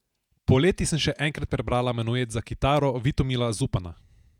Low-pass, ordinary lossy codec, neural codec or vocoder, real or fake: 19.8 kHz; none; vocoder, 48 kHz, 128 mel bands, Vocos; fake